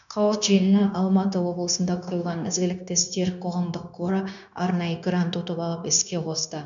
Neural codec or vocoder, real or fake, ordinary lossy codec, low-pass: codec, 16 kHz, 0.9 kbps, LongCat-Audio-Codec; fake; none; 7.2 kHz